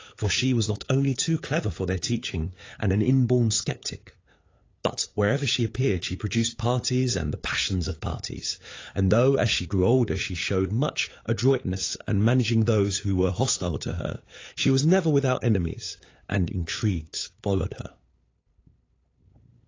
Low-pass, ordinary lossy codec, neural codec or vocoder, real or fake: 7.2 kHz; AAC, 32 kbps; codec, 16 kHz, 8 kbps, FunCodec, trained on LibriTTS, 25 frames a second; fake